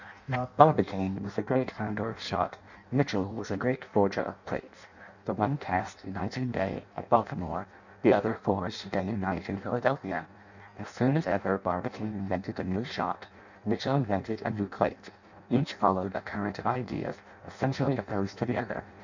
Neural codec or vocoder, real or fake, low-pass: codec, 16 kHz in and 24 kHz out, 0.6 kbps, FireRedTTS-2 codec; fake; 7.2 kHz